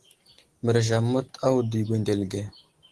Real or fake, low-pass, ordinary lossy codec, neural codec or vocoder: real; 10.8 kHz; Opus, 16 kbps; none